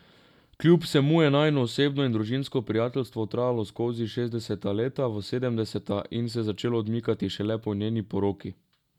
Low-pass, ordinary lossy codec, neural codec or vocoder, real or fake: 19.8 kHz; none; none; real